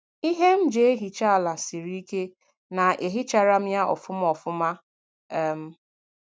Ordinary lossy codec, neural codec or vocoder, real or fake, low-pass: none; none; real; none